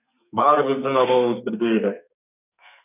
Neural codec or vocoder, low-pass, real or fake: codec, 32 kHz, 1.9 kbps, SNAC; 3.6 kHz; fake